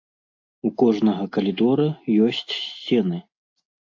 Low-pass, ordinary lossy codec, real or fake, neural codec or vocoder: 7.2 kHz; AAC, 48 kbps; real; none